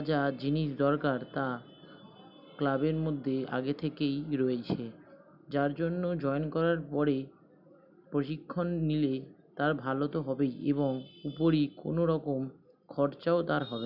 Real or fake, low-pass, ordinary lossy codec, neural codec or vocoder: real; 5.4 kHz; none; none